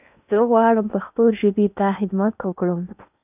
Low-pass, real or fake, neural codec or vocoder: 3.6 kHz; fake; codec, 16 kHz in and 24 kHz out, 0.8 kbps, FocalCodec, streaming, 65536 codes